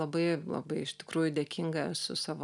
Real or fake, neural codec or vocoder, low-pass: real; none; 10.8 kHz